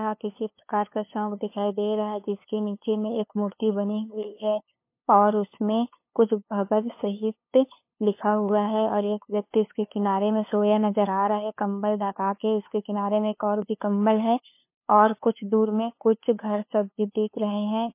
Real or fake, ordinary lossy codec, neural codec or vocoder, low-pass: fake; MP3, 24 kbps; codec, 16 kHz, 2 kbps, FunCodec, trained on LibriTTS, 25 frames a second; 3.6 kHz